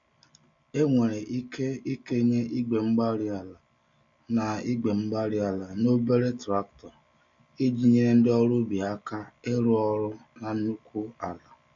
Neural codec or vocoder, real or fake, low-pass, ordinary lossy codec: none; real; 7.2 kHz; MP3, 48 kbps